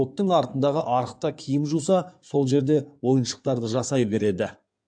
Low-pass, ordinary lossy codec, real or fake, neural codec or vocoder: 9.9 kHz; AAC, 64 kbps; fake; codec, 16 kHz in and 24 kHz out, 2.2 kbps, FireRedTTS-2 codec